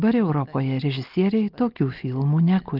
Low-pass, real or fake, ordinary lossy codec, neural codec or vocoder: 5.4 kHz; real; Opus, 24 kbps; none